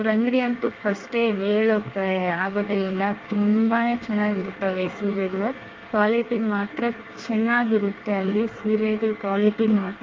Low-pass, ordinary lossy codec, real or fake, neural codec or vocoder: 7.2 kHz; Opus, 24 kbps; fake; codec, 24 kHz, 1 kbps, SNAC